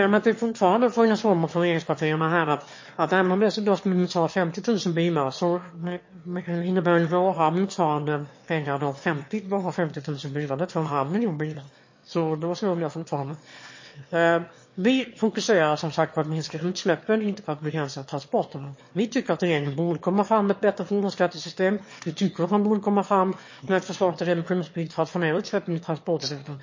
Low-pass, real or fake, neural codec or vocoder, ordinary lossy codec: 7.2 kHz; fake; autoencoder, 22.05 kHz, a latent of 192 numbers a frame, VITS, trained on one speaker; MP3, 32 kbps